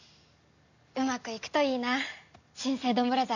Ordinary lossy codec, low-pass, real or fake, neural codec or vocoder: none; 7.2 kHz; real; none